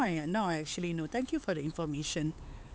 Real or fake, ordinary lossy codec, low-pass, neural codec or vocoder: fake; none; none; codec, 16 kHz, 4 kbps, X-Codec, HuBERT features, trained on LibriSpeech